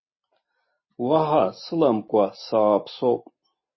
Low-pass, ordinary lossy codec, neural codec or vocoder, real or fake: 7.2 kHz; MP3, 24 kbps; none; real